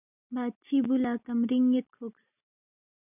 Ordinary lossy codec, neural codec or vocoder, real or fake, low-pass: AAC, 32 kbps; none; real; 3.6 kHz